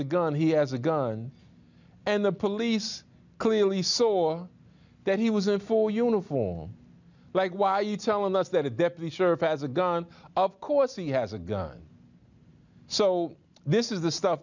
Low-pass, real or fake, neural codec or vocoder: 7.2 kHz; real; none